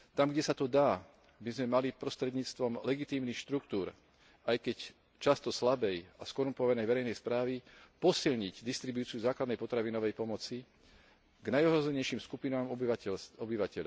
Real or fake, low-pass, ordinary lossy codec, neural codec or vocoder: real; none; none; none